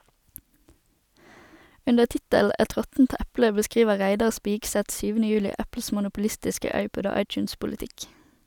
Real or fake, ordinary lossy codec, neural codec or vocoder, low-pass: real; none; none; 19.8 kHz